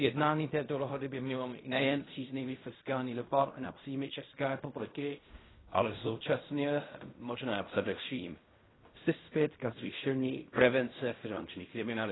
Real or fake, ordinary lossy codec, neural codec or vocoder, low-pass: fake; AAC, 16 kbps; codec, 16 kHz in and 24 kHz out, 0.4 kbps, LongCat-Audio-Codec, fine tuned four codebook decoder; 7.2 kHz